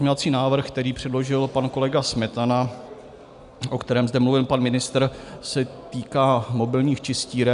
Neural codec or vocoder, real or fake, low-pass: none; real; 10.8 kHz